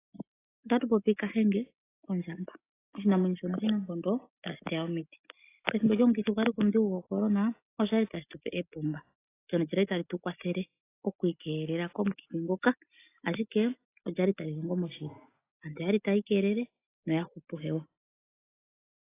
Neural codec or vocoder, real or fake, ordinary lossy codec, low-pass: none; real; AAC, 24 kbps; 3.6 kHz